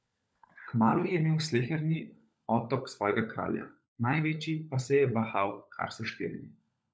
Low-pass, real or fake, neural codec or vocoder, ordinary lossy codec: none; fake; codec, 16 kHz, 16 kbps, FunCodec, trained on LibriTTS, 50 frames a second; none